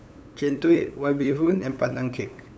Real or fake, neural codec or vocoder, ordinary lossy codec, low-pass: fake; codec, 16 kHz, 8 kbps, FunCodec, trained on LibriTTS, 25 frames a second; none; none